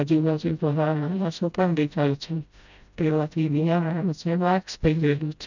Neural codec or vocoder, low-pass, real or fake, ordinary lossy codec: codec, 16 kHz, 0.5 kbps, FreqCodec, smaller model; 7.2 kHz; fake; none